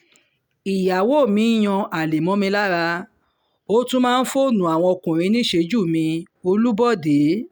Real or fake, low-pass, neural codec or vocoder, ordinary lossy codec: real; none; none; none